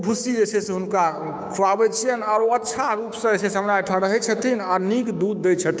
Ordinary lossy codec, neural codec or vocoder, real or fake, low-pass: none; codec, 16 kHz, 6 kbps, DAC; fake; none